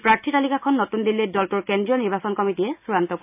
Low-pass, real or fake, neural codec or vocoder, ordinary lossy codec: 3.6 kHz; real; none; none